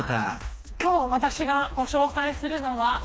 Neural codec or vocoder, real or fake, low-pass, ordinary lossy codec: codec, 16 kHz, 2 kbps, FreqCodec, smaller model; fake; none; none